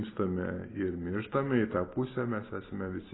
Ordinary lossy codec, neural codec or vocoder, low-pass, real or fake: AAC, 16 kbps; none; 7.2 kHz; real